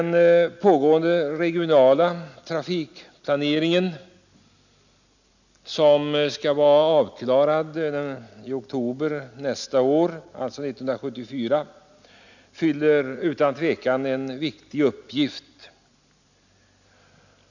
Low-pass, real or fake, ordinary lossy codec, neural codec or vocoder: 7.2 kHz; real; none; none